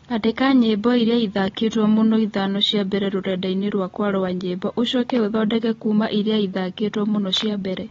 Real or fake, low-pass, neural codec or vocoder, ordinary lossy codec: real; 7.2 kHz; none; AAC, 24 kbps